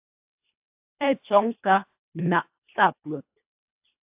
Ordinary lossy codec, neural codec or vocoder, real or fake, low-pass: AAC, 32 kbps; codec, 24 kHz, 1.5 kbps, HILCodec; fake; 3.6 kHz